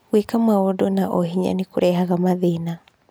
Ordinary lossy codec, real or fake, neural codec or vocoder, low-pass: none; real; none; none